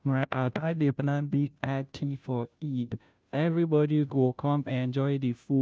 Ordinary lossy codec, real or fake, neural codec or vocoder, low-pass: none; fake; codec, 16 kHz, 0.5 kbps, FunCodec, trained on Chinese and English, 25 frames a second; none